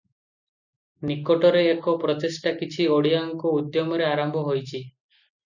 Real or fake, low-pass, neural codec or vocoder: real; 7.2 kHz; none